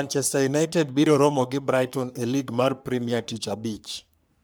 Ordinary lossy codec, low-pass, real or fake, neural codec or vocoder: none; none; fake; codec, 44.1 kHz, 3.4 kbps, Pupu-Codec